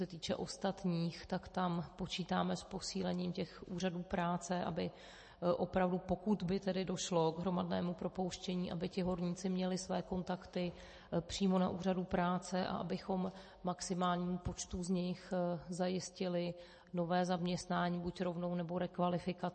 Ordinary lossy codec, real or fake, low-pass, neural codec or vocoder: MP3, 32 kbps; real; 10.8 kHz; none